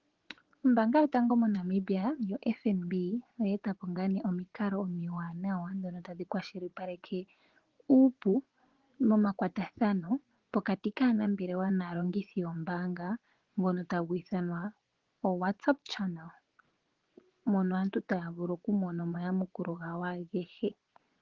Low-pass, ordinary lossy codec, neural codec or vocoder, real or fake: 7.2 kHz; Opus, 16 kbps; vocoder, 24 kHz, 100 mel bands, Vocos; fake